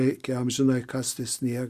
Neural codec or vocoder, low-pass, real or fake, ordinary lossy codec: none; 14.4 kHz; real; Opus, 64 kbps